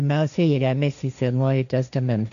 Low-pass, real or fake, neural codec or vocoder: 7.2 kHz; fake; codec, 16 kHz, 1.1 kbps, Voila-Tokenizer